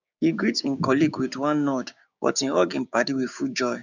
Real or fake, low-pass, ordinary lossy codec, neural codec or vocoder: fake; 7.2 kHz; none; codec, 16 kHz, 6 kbps, DAC